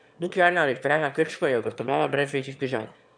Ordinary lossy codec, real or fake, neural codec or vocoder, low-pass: none; fake; autoencoder, 22.05 kHz, a latent of 192 numbers a frame, VITS, trained on one speaker; 9.9 kHz